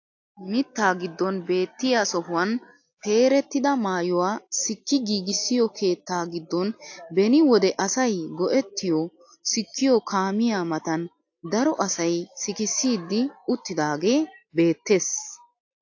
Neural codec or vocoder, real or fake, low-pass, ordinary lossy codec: none; real; 7.2 kHz; AAC, 48 kbps